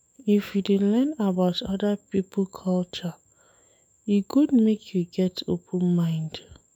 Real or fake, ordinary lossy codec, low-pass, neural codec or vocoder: fake; none; 19.8 kHz; autoencoder, 48 kHz, 128 numbers a frame, DAC-VAE, trained on Japanese speech